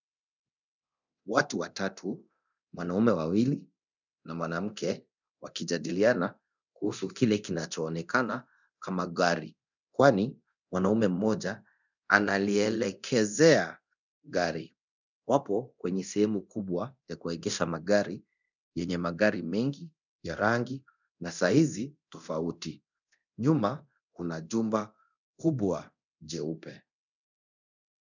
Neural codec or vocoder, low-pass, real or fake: codec, 24 kHz, 0.9 kbps, DualCodec; 7.2 kHz; fake